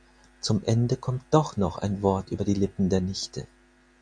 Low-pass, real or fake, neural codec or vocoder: 9.9 kHz; real; none